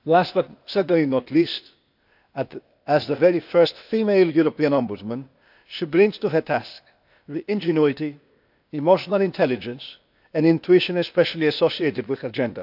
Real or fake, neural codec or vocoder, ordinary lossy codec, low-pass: fake; codec, 16 kHz, 0.8 kbps, ZipCodec; none; 5.4 kHz